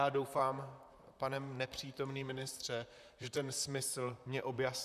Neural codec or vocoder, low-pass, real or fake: vocoder, 44.1 kHz, 128 mel bands, Pupu-Vocoder; 14.4 kHz; fake